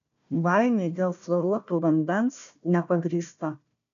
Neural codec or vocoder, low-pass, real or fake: codec, 16 kHz, 1 kbps, FunCodec, trained on Chinese and English, 50 frames a second; 7.2 kHz; fake